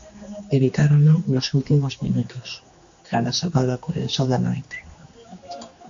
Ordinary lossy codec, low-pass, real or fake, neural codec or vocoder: AAC, 64 kbps; 7.2 kHz; fake; codec, 16 kHz, 2 kbps, X-Codec, HuBERT features, trained on general audio